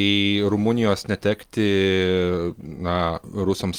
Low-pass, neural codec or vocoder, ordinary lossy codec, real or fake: 19.8 kHz; none; Opus, 24 kbps; real